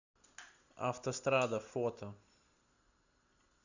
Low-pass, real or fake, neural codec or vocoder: 7.2 kHz; real; none